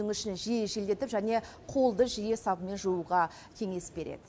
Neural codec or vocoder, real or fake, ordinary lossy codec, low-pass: none; real; none; none